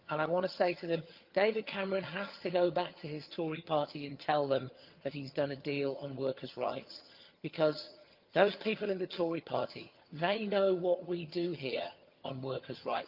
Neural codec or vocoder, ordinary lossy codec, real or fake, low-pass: vocoder, 22.05 kHz, 80 mel bands, HiFi-GAN; Opus, 24 kbps; fake; 5.4 kHz